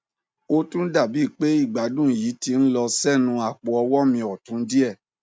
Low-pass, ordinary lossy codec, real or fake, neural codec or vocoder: none; none; real; none